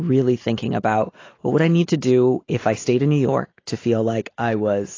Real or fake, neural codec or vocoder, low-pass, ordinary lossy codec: real; none; 7.2 kHz; AAC, 32 kbps